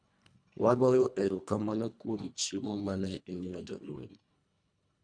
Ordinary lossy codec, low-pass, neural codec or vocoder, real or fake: none; 9.9 kHz; codec, 24 kHz, 1.5 kbps, HILCodec; fake